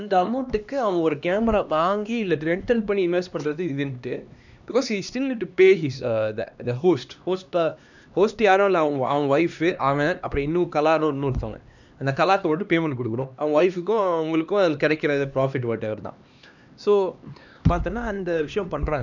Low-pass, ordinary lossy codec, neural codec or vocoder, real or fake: 7.2 kHz; none; codec, 16 kHz, 2 kbps, X-Codec, HuBERT features, trained on LibriSpeech; fake